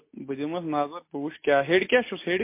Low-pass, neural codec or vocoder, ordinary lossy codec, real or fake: 3.6 kHz; none; MP3, 24 kbps; real